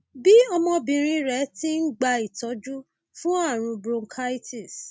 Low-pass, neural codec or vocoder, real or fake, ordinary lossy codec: none; none; real; none